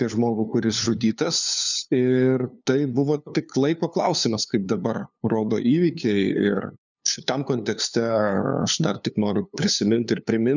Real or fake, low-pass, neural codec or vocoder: fake; 7.2 kHz; codec, 16 kHz, 2 kbps, FunCodec, trained on LibriTTS, 25 frames a second